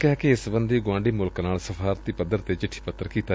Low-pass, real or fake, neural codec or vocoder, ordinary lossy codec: none; real; none; none